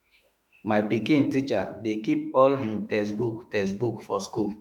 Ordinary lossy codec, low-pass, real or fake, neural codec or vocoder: none; 19.8 kHz; fake; autoencoder, 48 kHz, 32 numbers a frame, DAC-VAE, trained on Japanese speech